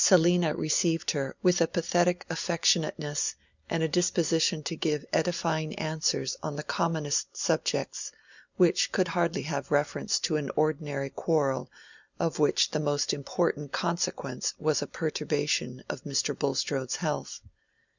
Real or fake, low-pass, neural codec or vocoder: real; 7.2 kHz; none